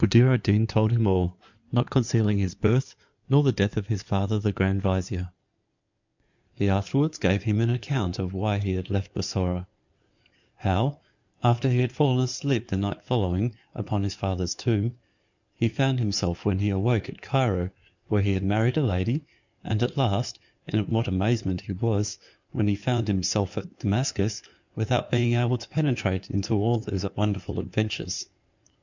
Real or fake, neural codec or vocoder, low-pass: fake; codec, 16 kHz in and 24 kHz out, 2.2 kbps, FireRedTTS-2 codec; 7.2 kHz